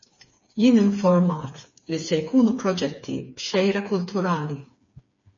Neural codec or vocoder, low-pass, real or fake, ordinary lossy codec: codec, 16 kHz, 4 kbps, FreqCodec, smaller model; 7.2 kHz; fake; MP3, 32 kbps